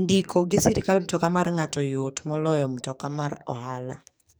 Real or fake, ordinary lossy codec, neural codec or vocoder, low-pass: fake; none; codec, 44.1 kHz, 2.6 kbps, SNAC; none